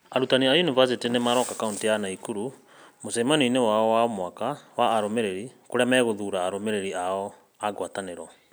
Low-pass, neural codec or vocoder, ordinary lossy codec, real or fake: none; none; none; real